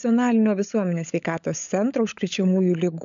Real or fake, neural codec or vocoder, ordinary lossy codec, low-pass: fake; codec, 16 kHz, 16 kbps, FunCodec, trained on LibriTTS, 50 frames a second; MP3, 96 kbps; 7.2 kHz